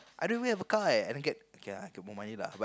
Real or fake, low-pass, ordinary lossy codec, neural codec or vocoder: real; none; none; none